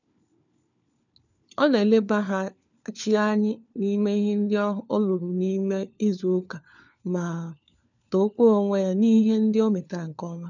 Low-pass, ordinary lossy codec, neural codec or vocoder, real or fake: 7.2 kHz; none; codec, 16 kHz, 4 kbps, FunCodec, trained on LibriTTS, 50 frames a second; fake